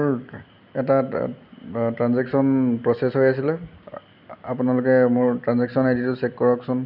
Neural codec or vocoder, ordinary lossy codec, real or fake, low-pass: none; none; real; 5.4 kHz